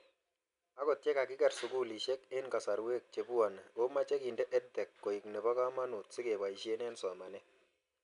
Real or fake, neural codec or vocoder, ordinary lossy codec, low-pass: real; none; none; 10.8 kHz